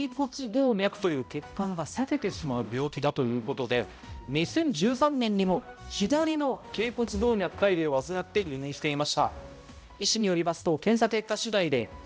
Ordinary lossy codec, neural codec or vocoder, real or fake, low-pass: none; codec, 16 kHz, 0.5 kbps, X-Codec, HuBERT features, trained on balanced general audio; fake; none